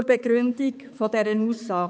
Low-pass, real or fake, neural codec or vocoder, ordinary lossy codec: none; fake; codec, 16 kHz, 4 kbps, X-Codec, HuBERT features, trained on balanced general audio; none